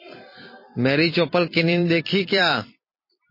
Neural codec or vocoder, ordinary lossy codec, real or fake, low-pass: vocoder, 44.1 kHz, 128 mel bands every 256 samples, BigVGAN v2; MP3, 24 kbps; fake; 5.4 kHz